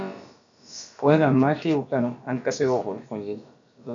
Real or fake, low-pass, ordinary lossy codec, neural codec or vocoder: fake; 7.2 kHz; MP3, 64 kbps; codec, 16 kHz, about 1 kbps, DyCAST, with the encoder's durations